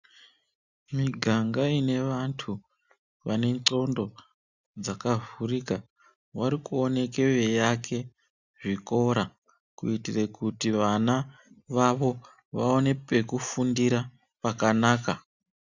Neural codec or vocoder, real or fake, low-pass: none; real; 7.2 kHz